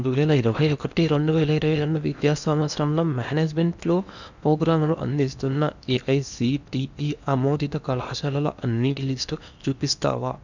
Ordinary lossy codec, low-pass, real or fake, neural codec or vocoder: none; 7.2 kHz; fake; codec, 16 kHz in and 24 kHz out, 0.8 kbps, FocalCodec, streaming, 65536 codes